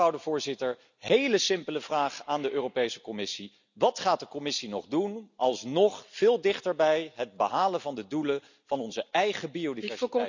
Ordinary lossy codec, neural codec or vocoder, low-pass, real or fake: none; none; 7.2 kHz; real